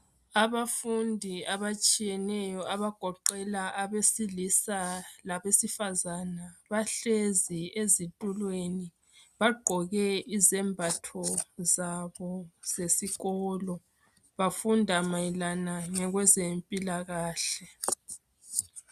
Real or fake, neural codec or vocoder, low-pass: real; none; 14.4 kHz